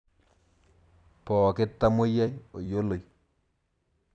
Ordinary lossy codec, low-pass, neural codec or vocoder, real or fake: none; 9.9 kHz; vocoder, 44.1 kHz, 128 mel bands every 256 samples, BigVGAN v2; fake